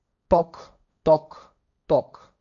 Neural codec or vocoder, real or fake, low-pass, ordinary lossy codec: codec, 16 kHz, 1.1 kbps, Voila-Tokenizer; fake; 7.2 kHz; none